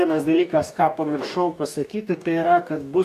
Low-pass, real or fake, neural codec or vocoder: 14.4 kHz; fake; codec, 44.1 kHz, 2.6 kbps, DAC